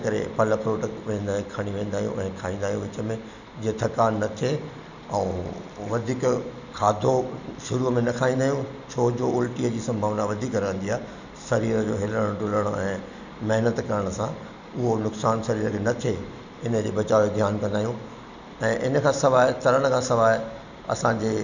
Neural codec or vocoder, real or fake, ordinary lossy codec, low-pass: none; real; none; 7.2 kHz